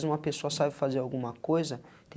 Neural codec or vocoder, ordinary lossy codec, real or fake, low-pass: none; none; real; none